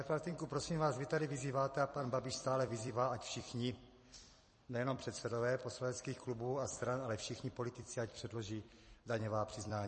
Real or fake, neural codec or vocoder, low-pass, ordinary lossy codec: fake; vocoder, 44.1 kHz, 128 mel bands every 256 samples, BigVGAN v2; 10.8 kHz; MP3, 32 kbps